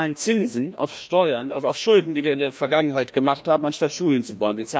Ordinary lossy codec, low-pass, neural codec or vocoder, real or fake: none; none; codec, 16 kHz, 1 kbps, FreqCodec, larger model; fake